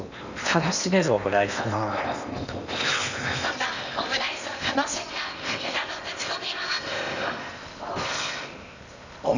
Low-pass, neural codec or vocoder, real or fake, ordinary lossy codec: 7.2 kHz; codec, 16 kHz in and 24 kHz out, 0.8 kbps, FocalCodec, streaming, 65536 codes; fake; none